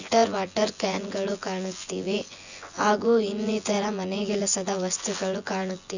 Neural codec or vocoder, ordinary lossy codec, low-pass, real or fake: vocoder, 24 kHz, 100 mel bands, Vocos; none; 7.2 kHz; fake